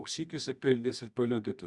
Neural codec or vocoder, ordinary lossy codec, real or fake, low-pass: codec, 24 kHz, 0.9 kbps, WavTokenizer, medium music audio release; Opus, 64 kbps; fake; 10.8 kHz